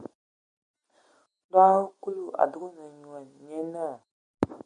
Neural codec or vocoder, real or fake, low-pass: none; real; 9.9 kHz